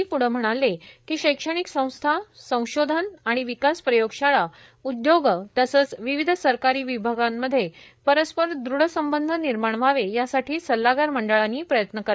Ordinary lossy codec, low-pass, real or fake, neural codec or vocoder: none; none; fake; codec, 16 kHz, 8 kbps, FreqCodec, larger model